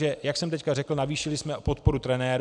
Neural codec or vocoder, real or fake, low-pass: none; real; 10.8 kHz